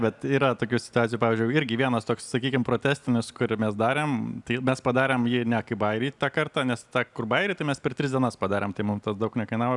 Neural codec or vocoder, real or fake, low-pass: none; real; 10.8 kHz